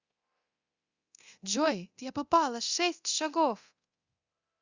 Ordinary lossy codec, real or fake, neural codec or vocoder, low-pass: Opus, 64 kbps; fake; codec, 24 kHz, 0.9 kbps, DualCodec; 7.2 kHz